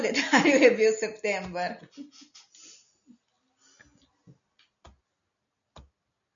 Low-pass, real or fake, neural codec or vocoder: 7.2 kHz; real; none